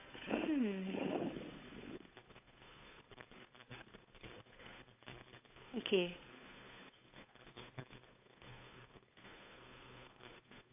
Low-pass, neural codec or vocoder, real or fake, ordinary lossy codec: 3.6 kHz; codec, 16 kHz, 8 kbps, FunCodec, trained on Chinese and English, 25 frames a second; fake; AAC, 32 kbps